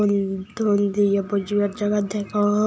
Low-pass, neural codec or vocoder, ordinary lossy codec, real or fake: none; none; none; real